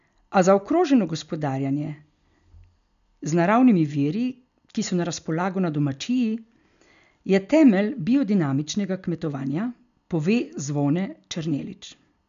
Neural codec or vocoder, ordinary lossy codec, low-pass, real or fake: none; none; 7.2 kHz; real